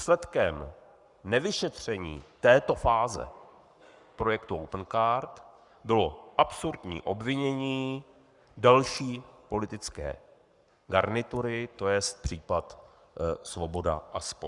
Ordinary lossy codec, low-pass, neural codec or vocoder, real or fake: Opus, 64 kbps; 10.8 kHz; codec, 44.1 kHz, 7.8 kbps, Pupu-Codec; fake